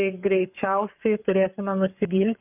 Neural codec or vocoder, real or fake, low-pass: codec, 44.1 kHz, 7.8 kbps, Pupu-Codec; fake; 3.6 kHz